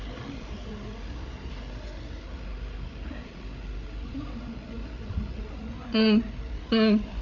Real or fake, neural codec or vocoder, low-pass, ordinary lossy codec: fake; codec, 16 kHz, 8 kbps, FreqCodec, larger model; 7.2 kHz; none